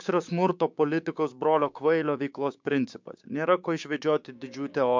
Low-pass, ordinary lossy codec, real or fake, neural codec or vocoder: 7.2 kHz; MP3, 64 kbps; fake; codec, 16 kHz, 6 kbps, DAC